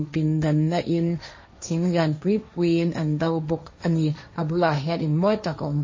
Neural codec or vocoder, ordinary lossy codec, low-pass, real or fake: codec, 16 kHz, 1.1 kbps, Voila-Tokenizer; MP3, 32 kbps; 7.2 kHz; fake